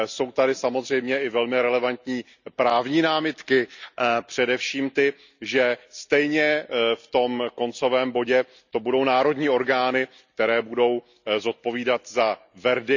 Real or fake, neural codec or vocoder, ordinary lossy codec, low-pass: real; none; none; 7.2 kHz